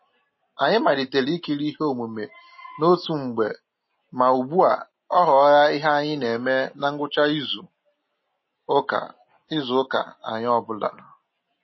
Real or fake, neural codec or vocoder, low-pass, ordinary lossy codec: real; none; 7.2 kHz; MP3, 24 kbps